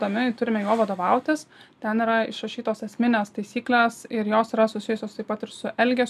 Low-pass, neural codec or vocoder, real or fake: 14.4 kHz; none; real